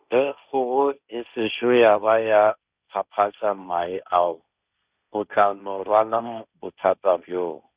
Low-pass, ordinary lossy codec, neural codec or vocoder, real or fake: 3.6 kHz; Opus, 64 kbps; codec, 16 kHz, 1.1 kbps, Voila-Tokenizer; fake